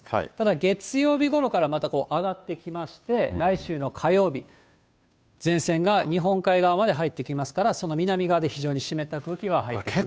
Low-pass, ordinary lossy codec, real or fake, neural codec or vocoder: none; none; fake; codec, 16 kHz, 2 kbps, FunCodec, trained on Chinese and English, 25 frames a second